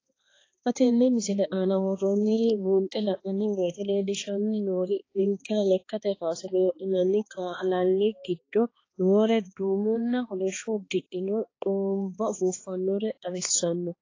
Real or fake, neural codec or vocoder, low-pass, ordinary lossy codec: fake; codec, 16 kHz, 2 kbps, X-Codec, HuBERT features, trained on balanced general audio; 7.2 kHz; AAC, 32 kbps